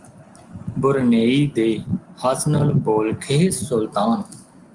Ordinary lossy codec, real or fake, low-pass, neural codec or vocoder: Opus, 32 kbps; real; 10.8 kHz; none